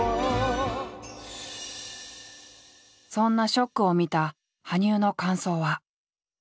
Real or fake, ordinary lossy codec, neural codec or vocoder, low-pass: real; none; none; none